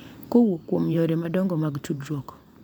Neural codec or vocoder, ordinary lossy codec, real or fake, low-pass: vocoder, 44.1 kHz, 128 mel bands every 512 samples, BigVGAN v2; none; fake; 19.8 kHz